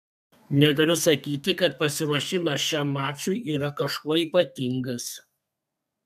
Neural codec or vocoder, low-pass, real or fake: codec, 32 kHz, 1.9 kbps, SNAC; 14.4 kHz; fake